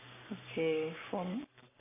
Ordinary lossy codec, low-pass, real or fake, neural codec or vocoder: none; 3.6 kHz; fake; codec, 44.1 kHz, 7.8 kbps, Pupu-Codec